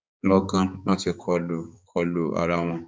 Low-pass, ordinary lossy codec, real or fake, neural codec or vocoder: none; none; fake; codec, 16 kHz, 4 kbps, X-Codec, HuBERT features, trained on balanced general audio